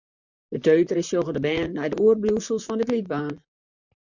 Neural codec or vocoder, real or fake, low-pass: vocoder, 44.1 kHz, 128 mel bands, Pupu-Vocoder; fake; 7.2 kHz